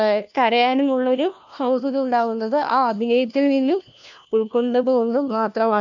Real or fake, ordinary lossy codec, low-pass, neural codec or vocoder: fake; none; 7.2 kHz; codec, 16 kHz, 1 kbps, FunCodec, trained on LibriTTS, 50 frames a second